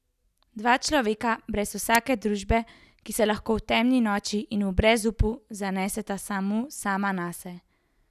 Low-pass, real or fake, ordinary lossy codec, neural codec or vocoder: 14.4 kHz; real; none; none